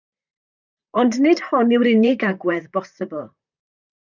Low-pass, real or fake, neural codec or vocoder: 7.2 kHz; fake; vocoder, 44.1 kHz, 128 mel bands, Pupu-Vocoder